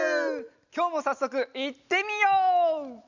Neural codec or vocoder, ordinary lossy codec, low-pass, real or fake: none; none; 7.2 kHz; real